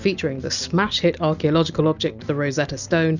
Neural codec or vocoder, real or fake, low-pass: none; real; 7.2 kHz